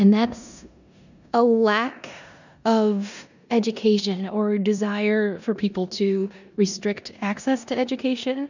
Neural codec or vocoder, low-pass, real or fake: codec, 16 kHz in and 24 kHz out, 0.9 kbps, LongCat-Audio-Codec, four codebook decoder; 7.2 kHz; fake